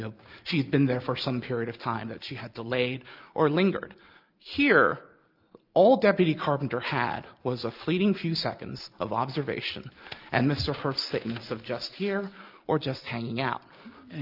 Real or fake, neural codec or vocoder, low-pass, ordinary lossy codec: real; none; 5.4 kHz; Opus, 24 kbps